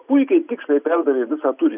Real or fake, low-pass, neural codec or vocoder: real; 3.6 kHz; none